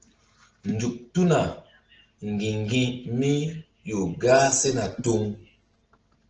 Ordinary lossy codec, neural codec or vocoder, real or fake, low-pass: Opus, 16 kbps; none; real; 7.2 kHz